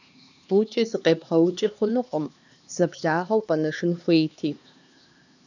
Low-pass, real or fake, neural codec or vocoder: 7.2 kHz; fake; codec, 16 kHz, 2 kbps, X-Codec, HuBERT features, trained on LibriSpeech